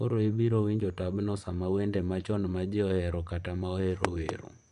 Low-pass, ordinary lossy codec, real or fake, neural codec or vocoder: 10.8 kHz; none; fake; vocoder, 24 kHz, 100 mel bands, Vocos